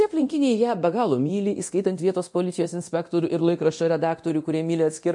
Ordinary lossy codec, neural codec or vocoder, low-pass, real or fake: MP3, 48 kbps; codec, 24 kHz, 0.9 kbps, DualCodec; 10.8 kHz; fake